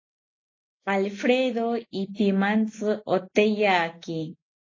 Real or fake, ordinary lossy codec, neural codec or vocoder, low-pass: real; AAC, 32 kbps; none; 7.2 kHz